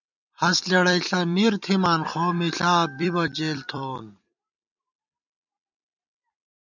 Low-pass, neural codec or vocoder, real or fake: 7.2 kHz; vocoder, 44.1 kHz, 128 mel bands every 256 samples, BigVGAN v2; fake